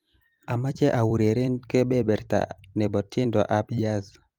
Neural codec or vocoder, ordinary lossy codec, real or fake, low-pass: vocoder, 44.1 kHz, 128 mel bands every 256 samples, BigVGAN v2; Opus, 32 kbps; fake; 19.8 kHz